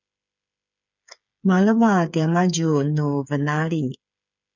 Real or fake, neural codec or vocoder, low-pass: fake; codec, 16 kHz, 8 kbps, FreqCodec, smaller model; 7.2 kHz